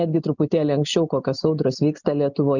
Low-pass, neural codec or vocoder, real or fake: 7.2 kHz; none; real